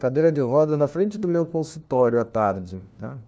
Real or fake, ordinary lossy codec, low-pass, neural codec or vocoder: fake; none; none; codec, 16 kHz, 1 kbps, FunCodec, trained on LibriTTS, 50 frames a second